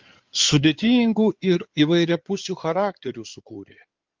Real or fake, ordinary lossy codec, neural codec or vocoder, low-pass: fake; Opus, 32 kbps; codec, 16 kHz in and 24 kHz out, 2.2 kbps, FireRedTTS-2 codec; 7.2 kHz